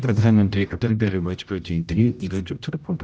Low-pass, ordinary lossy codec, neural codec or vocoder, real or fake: none; none; codec, 16 kHz, 0.5 kbps, X-Codec, HuBERT features, trained on general audio; fake